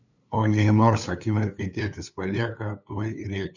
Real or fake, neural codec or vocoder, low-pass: fake; codec, 16 kHz, 2 kbps, FunCodec, trained on LibriTTS, 25 frames a second; 7.2 kHz